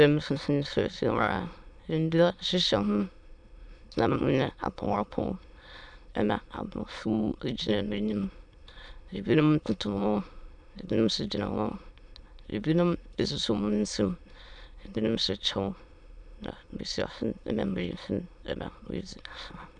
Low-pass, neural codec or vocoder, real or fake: 9.9 kHz; autoencoder, 22.05 kHz, a latent of 192 numbers a frame, VITS, trained on many speakers; fake